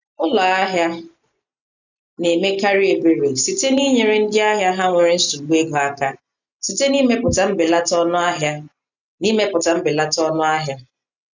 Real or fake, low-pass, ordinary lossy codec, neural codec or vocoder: real; 7.2 kHz; none; none